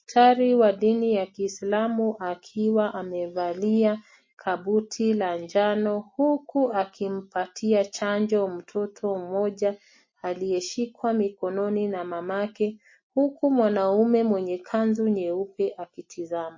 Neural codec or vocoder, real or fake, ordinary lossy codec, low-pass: none; real; MP3, 32 kbps; 7.2 kHz